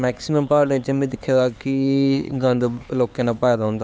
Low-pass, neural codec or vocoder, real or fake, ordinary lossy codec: none; codec, 16 kHz, 4 kbps, X-Codec, HuBERT features, trained on LibriSpeech; fake; none